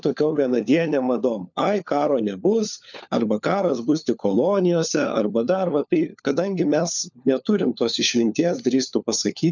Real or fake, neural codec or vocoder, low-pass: fake; codec, 16 kHz, 4 kbps, FunCodec, trained on LibriTTS, 50 frames a second; 7.2 kHz